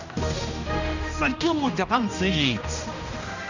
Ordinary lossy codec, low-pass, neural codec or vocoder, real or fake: none; 7.2 kHz; codec, 16 kHz, 1 kbps, X-Codec, HuBERT features, trained on general audio; fake